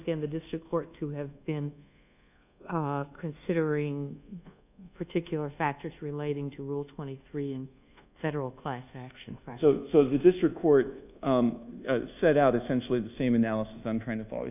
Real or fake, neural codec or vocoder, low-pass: fake; codec, 24 kHz, 1.2 kbps, DualCodec; 3.6 kHz